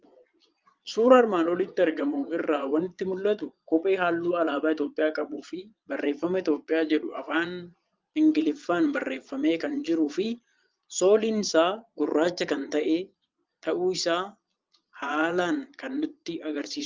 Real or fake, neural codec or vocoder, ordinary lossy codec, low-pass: fake; vocoder, 22.05 kHz, 80 mel bands, Vocos; Opus, 32 kbps; 7.2 kHz